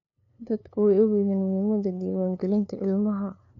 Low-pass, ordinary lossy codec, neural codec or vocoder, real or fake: 7.2 kHz; none; codec, 16 kHz, 2 kbps, FunCodec, trained on LibriTTS, 25 frames a second; fake